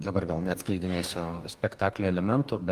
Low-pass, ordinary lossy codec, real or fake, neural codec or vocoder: 14.4 kHz; Opus, 32 kbps; fake; codec, 44.1 kHz, 2.6 kbps, DAC